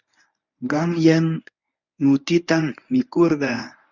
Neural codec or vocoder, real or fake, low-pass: codec, 24 kHz, 0.9 kbps, WavTokenizer, medium speech release version 1; fake; 7.2 kHz